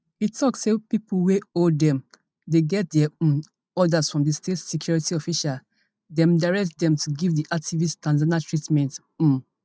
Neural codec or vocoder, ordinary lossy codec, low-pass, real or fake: none; none; none; real